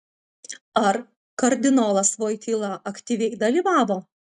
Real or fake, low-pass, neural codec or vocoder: real; 10.8 kHz; none